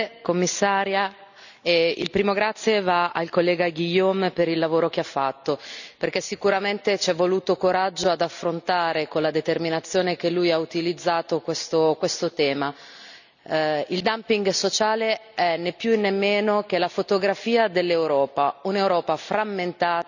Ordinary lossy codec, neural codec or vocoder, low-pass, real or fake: none; none; 7.2 kHz; real